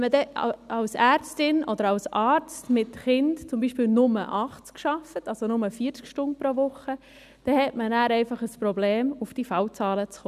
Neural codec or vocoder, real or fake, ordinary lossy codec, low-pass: none; real; none; 14.4 kHz